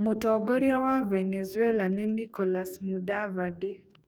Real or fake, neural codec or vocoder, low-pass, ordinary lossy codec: fake; codec, 44.1 kHz, 2.6 kbps, DAC; none; none